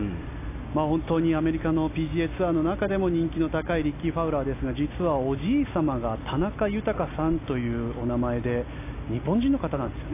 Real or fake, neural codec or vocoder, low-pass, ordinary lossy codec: real; none; 3.6 kHz; AAC, 24 kbps